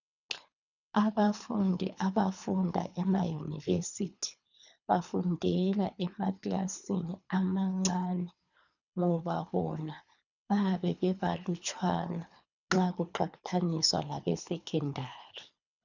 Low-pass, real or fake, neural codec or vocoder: 7.2 kHz; fake; codec, 24 kHz, 3 kbps, HILCodec